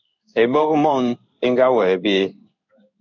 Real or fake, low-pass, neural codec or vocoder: fake; 7.2 kHz; codec, 16 kHz in and 24 kHz out, 1 kbps, XY-Tokenizer